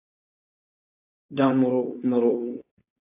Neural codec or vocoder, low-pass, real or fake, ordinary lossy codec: codec, 16 kHz, 4.8 kbps, FACodec; 3.6 kHz; fake; AAC, 32 kbps